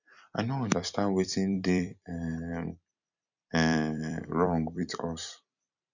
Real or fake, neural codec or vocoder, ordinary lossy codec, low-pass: fake; vocoder, 44.1 kHz, 128 mel bands every 256 samples, BigVGAN v2; none; 7.2 kHz